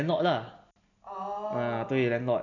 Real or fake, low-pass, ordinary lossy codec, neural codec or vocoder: real; 7.2 kHz; none; none